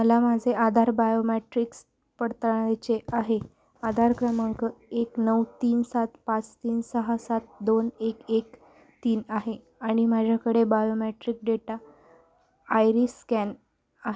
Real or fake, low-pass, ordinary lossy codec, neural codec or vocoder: real; none; none; none